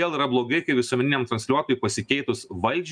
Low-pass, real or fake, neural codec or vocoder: 9.9 kHz; real; none